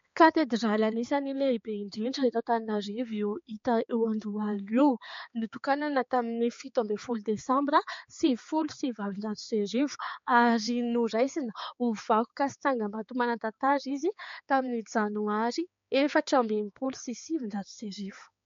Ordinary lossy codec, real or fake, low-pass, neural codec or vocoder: MP3, 48 kbps; fake; 7.2 kHz; codec, 16 kHz, 4 kbps, X-Codec, HuBERT features, trained on balanced general audio